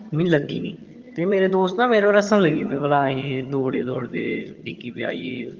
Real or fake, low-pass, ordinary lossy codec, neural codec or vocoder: fake; 7.2 kHz; Opus, 32 kbps; vocoder, 22.05 kHz, 80 mel bands, HiFi-GAN